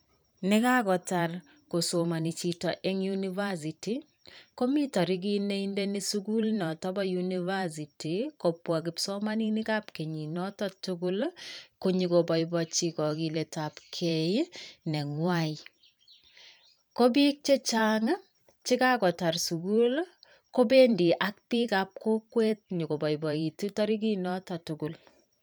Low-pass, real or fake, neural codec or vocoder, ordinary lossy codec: none; fake; vocoder, 44.1 kHz, 128 mel bands every 512 samples, BigVGAN v2; none